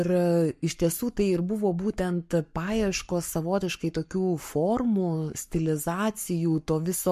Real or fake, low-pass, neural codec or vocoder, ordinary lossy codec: fake; 14.4 kHz; codec, 44.1 kHz, 7.8 kbps, Pupu-Codec; MP3, 64 kbps